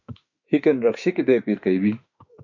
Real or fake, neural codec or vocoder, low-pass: fake; autoencoder, 48 kHz, 32 numbers a frame, DAC-VAE, trained on Japanese speech; 7.2 kHz